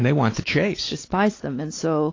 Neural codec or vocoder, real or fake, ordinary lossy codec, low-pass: codec, 16 kHz, 4 kbps, X-Codec, WavLM features, trained on Multilingual LibriSpeech; fake; AAC, 32 kbps; 7.2 kHz